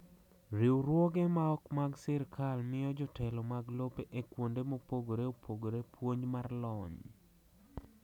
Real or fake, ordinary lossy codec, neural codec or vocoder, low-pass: real; none; none; 19.8 kHz